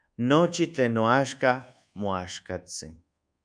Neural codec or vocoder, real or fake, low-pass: codec, 24 kHz, 1.2 kbps, DualCodec; fake; 9.9 kHz